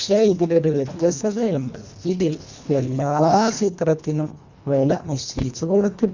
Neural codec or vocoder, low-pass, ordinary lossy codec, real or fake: codec, 24 kHz, 1.5 kbps, HILCodec; 7.2 kHz; Opus, 64 kbps; fake